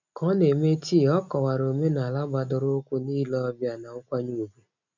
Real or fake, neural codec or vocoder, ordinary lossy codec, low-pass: real; none; none; 7.2 kHz